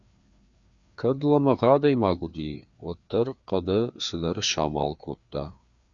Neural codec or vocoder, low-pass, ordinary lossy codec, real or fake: codec, 16 kHz, 2 kbps, FreqCodec, larger model; 7.2 kHz; Opus, 64 kbps; fake